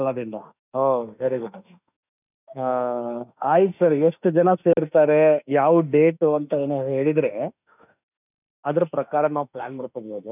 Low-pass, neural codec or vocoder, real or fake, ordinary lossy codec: 3.6 kHz; autoencoder, 48 kHz, 32 numbers a frame, DAC-VAE, trained on Japanese speech; fake; none